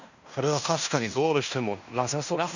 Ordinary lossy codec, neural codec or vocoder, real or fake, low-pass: none; codec, 16 kHz in and 24 kHz out, 0.9 kbps, LongCat-Audio-Codec, fine tuned four codebook decoder; fake; 7.2 kHz